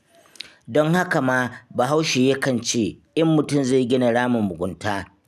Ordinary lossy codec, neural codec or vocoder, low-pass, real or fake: none; none; 14.4 kHz; real